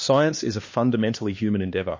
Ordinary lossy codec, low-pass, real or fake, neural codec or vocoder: MP3, 32 kbps; 7.2 kHz; fake; codec, 16 kHz, 2 kbps, X-Codec, HuBERT features, trained on LibriSpeech